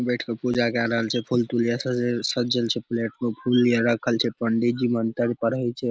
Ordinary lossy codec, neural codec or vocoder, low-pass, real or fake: none; none; none; real